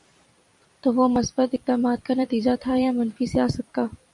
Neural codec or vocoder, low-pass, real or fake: vocoder, 44.1 kHz, 128 mel bands every 256 samples, BigVGAN v2; 10.8 kHz; fake